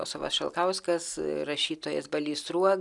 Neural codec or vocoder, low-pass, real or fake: none; 10.8 kHz; real